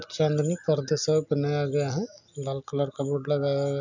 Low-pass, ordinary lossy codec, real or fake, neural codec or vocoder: 7.2 kHz; none; real; none